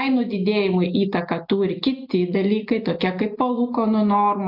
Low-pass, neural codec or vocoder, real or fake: 5.4 kHz; none; real